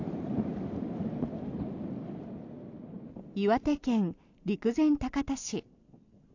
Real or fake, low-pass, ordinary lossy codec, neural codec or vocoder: real; 7.2 kHz; AAC, 48 kbps; none